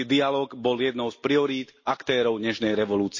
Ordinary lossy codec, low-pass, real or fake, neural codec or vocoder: MP3, 32 kbps; 7.2 kHz; real; none